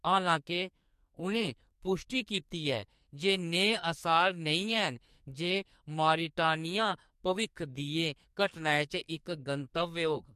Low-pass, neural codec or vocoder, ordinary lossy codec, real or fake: 14.4 kHz; codec, 44.1 kHz, 2.6 kbps, SNAC; MP3, 64 kbps; fake